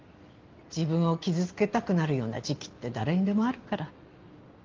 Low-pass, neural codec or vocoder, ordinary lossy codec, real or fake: 7.2 kHz; none; Opus, 32 kbps; real